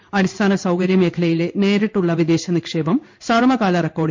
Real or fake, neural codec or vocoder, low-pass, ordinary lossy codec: fake; codec, 16 kHz in and 24 kHz out, 1 kbps, XY-Tokenizer; 7.2 kHz; MP3, 48 kbps